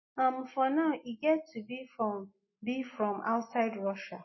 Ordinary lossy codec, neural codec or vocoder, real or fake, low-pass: MP3, 24 kbps; none; real; 7.2 kHz